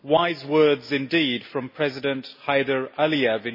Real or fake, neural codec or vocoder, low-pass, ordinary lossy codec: real; none; 5.4 kHz; MP3, 24 kbps